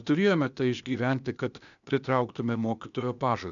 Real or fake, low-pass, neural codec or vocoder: fake; 7.2 kHz; codec, 16 kHz, 0.8 kbps, ZipCodec